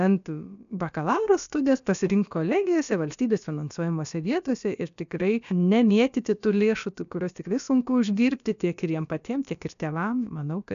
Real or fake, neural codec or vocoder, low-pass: fake; codec, 16 kHz, about 1 kbps, DyCAST, with the encoder's durations; 7.2 kHz